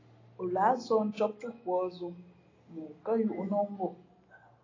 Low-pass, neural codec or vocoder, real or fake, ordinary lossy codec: 7.2 kHz; none; real; AAC, 32 kbps